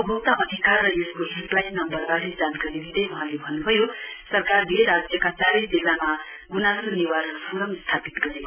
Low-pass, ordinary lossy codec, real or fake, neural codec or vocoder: 3.6 kHz; none; real; none